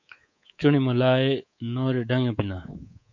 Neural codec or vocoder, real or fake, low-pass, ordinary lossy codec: codec, 24 kHz, 3.1 kbps, DualCodec; fake; 7.2 kHz; MP3, 48 kbps